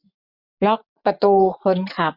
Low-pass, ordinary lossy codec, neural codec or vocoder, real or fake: 5.4 kHz; none; vocoder, 24 kHz, 100 mel bands, Vocos; fake